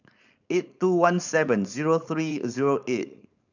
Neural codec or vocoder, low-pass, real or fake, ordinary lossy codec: codec, 16 kHz, 4.8 kbps, FACodec; 7.2 kHz; fake; none